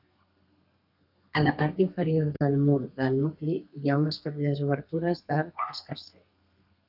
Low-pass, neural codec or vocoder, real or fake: 5.4 kHz; codec, 32 kHz, 1.9 kbps, SNAC; fake